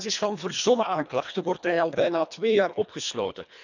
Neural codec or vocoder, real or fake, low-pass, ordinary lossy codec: codec, 24 kHz, 1.5 kbps, HILCodec; fake; 7.2 kHz; none